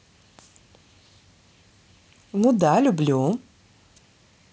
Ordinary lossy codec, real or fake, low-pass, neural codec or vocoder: none; real; none; none